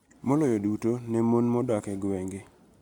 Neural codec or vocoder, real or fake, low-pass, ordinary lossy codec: none; real; 19.8 kHz; none